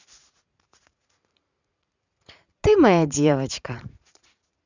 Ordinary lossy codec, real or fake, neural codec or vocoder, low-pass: none; fake; vocoder, 44.1 kHz, 80 mel bands, Vocos; 7.2 kHz